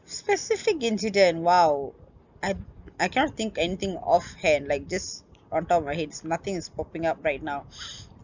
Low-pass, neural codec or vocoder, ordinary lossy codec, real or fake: 7.2 kHz; none; none; real